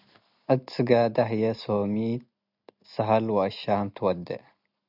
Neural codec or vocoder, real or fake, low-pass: none; real; 5.4 kHz